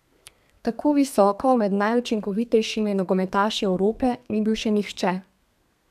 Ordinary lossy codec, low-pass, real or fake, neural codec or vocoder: none; 14.4 kHz; fake; codec, 32 kHz, 1.9 kbps, SNAC